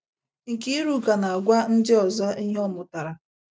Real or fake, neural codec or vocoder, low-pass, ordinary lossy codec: real; none; none; none